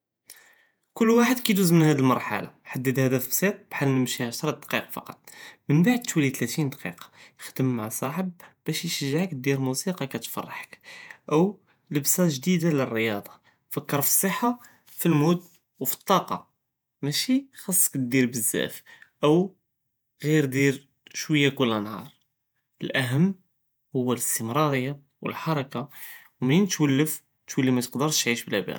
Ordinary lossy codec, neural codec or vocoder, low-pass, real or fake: none; vocoder, 48 kHz, 128 mel bands, Vocos; none; fake